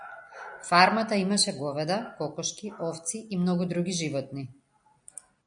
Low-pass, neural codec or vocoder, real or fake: 9.9 kHz; none; real